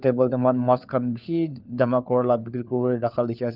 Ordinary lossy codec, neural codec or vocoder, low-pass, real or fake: Opus, 32 kbps; codec, 16 kHz, 4 kbps, FunCodec, trained on LibriTTS, 50 frames a second; 5.4 kHz; fake